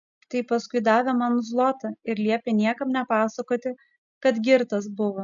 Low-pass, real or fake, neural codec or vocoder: 7.2 kHz; real; none